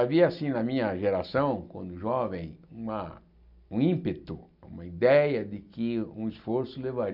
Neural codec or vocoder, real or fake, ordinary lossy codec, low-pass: none; real; none; 5.4 kHz